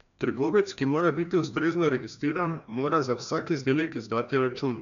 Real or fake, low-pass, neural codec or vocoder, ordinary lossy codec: fake; 7.2 kHz; codec, 16 kHz, 1 kbps, FreqCodec, larger model; none